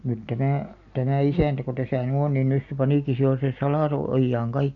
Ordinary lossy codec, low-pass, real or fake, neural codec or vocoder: none; 7.2 kHz; real; none